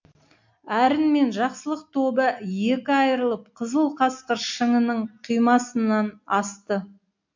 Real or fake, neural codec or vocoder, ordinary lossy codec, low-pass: real; none; MP3, 48 kbps; 7.2 kHz